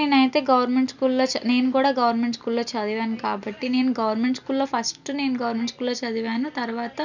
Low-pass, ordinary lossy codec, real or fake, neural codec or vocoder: 7.2 kHz; none; real; none